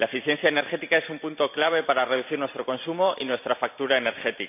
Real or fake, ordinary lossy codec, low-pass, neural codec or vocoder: fake; none; 3.6 kHz; vocoder, 44.1 kHz, 128 mel bands every 512 samples, BigVGAN v2